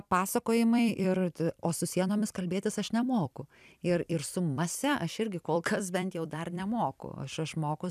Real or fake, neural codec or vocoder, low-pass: fake; vocoder, 44.1 kHz, 128 mel bands every 256 samples, BigVGAN v2; 14.4 kHz